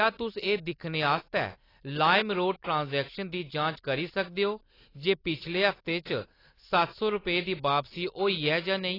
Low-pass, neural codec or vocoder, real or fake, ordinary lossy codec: 5.4 kHz; none; real; AAC, 24 kbps